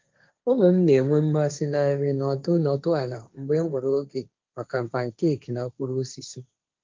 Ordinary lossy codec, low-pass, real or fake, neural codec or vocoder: Opus, 24 kbps; 7.2 kHz; fake; codec, 16 kHz, 1.1 kbps, Voila-Tokenizer